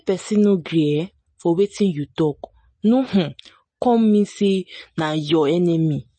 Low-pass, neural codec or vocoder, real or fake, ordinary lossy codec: 9.9 kHz; none; real; MP3, 32 kbps